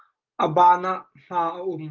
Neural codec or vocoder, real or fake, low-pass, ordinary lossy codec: none; real; 7.2 kHz; Opus, 32 kbps